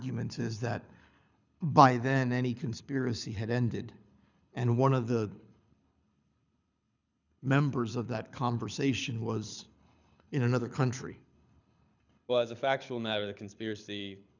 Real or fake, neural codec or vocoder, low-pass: fake; codec, 24 kHz, 6 kbps, HILCodec; 7.2 kHz